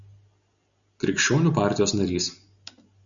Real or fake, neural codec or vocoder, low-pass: real; none; 7.2 kHz